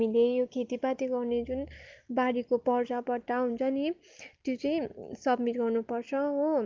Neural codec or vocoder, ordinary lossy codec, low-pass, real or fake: none; Opus, 32 kbps; 7.2 kHz; real